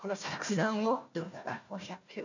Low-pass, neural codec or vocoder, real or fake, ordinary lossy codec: 7.2 kHz; codec, 16 kHz, 1 kbps, FunCodec, trained on Chinese and English, 50 frames a second; fake; none